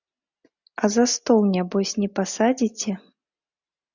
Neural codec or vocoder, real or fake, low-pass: none; real; 7.2 kHz